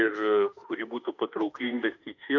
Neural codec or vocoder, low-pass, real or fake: autoencoder, 48 kHz, 32 numbers a frame, DAC-VAE, trained on Japanese speech; 7.2 kHz; fake